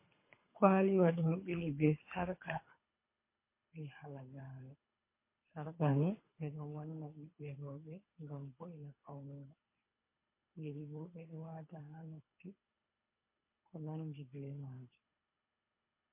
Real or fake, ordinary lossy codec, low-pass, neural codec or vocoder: fake; MP3, 24 kbps; 3.6 kHz; codec, 24 kHz, 3 kbps, HILCodec